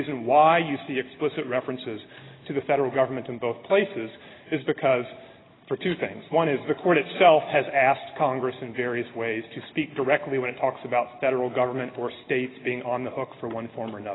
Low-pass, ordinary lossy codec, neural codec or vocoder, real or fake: 7.2 kHz; AAC, 16 kbps; none; real